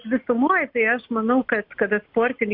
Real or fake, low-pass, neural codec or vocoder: real; 5.4 kHz; none